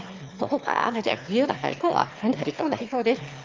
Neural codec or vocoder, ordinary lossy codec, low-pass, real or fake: autoencoder, 22.05 kHz, a latent of 192 numbers a frame, VITS, trained on one speaker; Opus, 32 kbps; 7.2 kHz; fake